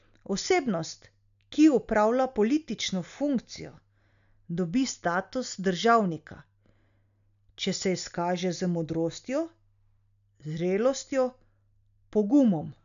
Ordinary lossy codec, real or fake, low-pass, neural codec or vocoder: none; real; 7.2 kHz; none